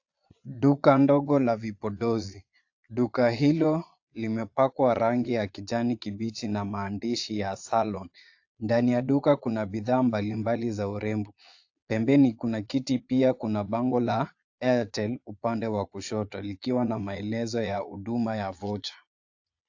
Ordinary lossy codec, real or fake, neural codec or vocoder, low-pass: AAC, 48 kbps; fake; vocoder, 22.05 kHz, 80 mel bands, Vocos; 7.2 kHz